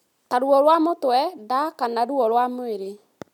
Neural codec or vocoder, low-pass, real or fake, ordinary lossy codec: none; 19.8 kHz; real; none